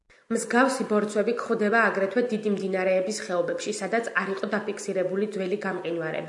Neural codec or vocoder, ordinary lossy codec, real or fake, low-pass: none; MP3, 64 kbps; real; 10.8 kHz